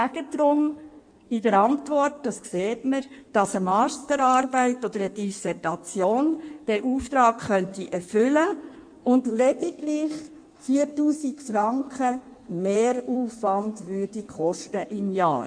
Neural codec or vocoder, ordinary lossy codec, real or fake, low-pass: codec, 16 kHz in and 24 kHz out, 1.1 kbps, FireRedTTS-2 codec; none; fake; 9.9 kHz